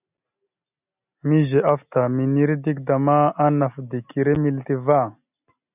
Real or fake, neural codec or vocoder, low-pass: real; none; 3.6 kHz